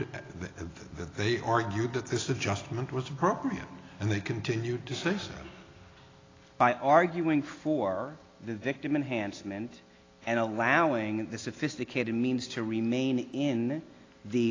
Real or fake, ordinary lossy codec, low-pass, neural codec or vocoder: real; AAC, 32 kbps; 7.2 kHz; none